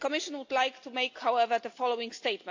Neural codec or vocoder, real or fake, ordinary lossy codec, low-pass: none; real; AAC, 48 kbps; 7.2 kHz